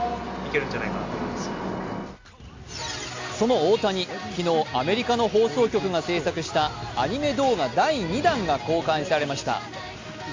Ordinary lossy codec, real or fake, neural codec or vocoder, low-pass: MP3, 48 kbps; real; none; 7.2 kHz